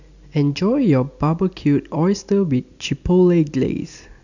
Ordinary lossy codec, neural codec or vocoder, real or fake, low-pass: none; none; real; 7.2 kHz